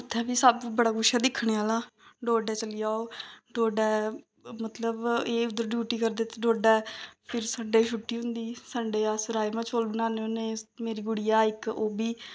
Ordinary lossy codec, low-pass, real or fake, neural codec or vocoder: none; none; real; none